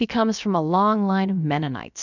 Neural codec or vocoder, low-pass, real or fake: codec, 16 kHz, about 1 kbps, DyCAST, with the encoder's durations; 7.2 kHz; fake